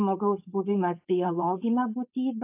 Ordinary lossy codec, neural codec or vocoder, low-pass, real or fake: AAC, 32 kbps; codec, 16 kHz, 4.8 kbps, FACodec; 3.6 kHz; fake